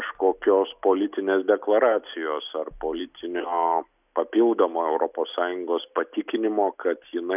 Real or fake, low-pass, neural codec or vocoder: real; 3.6 kHz; none